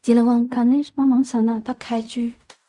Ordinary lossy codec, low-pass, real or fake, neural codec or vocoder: Opus, 64 kbps; 10.8 kHz; fake; codec, 16 kHz in and 24 kHz out, 0.4 kbps, LongCat-Audio-Codec, fine tuned four codebook decoder